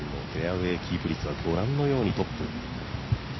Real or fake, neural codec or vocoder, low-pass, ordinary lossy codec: real; none; 7.2 kHz; MP3, 24 kbps